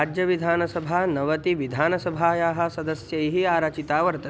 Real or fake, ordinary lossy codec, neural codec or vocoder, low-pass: real; none; none; none